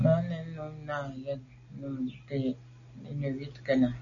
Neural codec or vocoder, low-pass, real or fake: none; 7.2 kHz; real